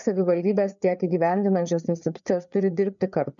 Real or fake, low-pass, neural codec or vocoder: fake; 7.2 kHz; codec, 16 kHz, 4 kbps, FreqCodec, larger model